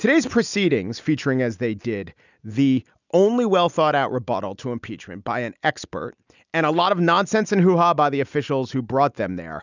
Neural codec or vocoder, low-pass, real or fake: none; 7.2 kHz; real